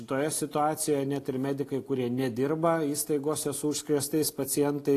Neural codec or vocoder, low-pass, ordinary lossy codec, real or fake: none; 14.4 kHz; AAC, 48 kbps; real